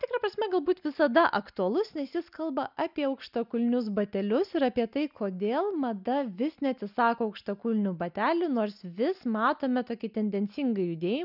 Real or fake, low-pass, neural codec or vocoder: real; 5.4 kHz; none